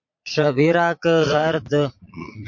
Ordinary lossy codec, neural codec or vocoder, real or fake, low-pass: MP3, 48 kbps; vocoder, 22.05 kHz, 80 mel bands, Vocos; fake; 7.2 kHz